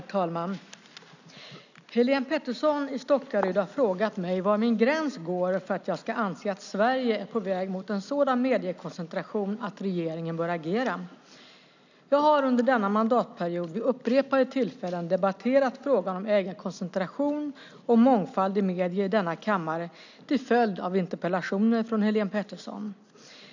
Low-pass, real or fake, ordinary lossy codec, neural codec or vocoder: 7.2 kHz; real; none; none